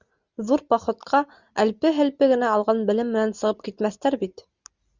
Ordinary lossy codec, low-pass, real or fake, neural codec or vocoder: Opus, 64 kbps; 7.2 kHz; real; none